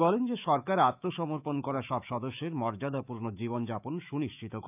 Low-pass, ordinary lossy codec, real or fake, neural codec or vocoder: 3.6 kHz; none; fake; autoencoder, 48 kHz, 128 numbers a frame, DAC-VAE, trained on Japanese speech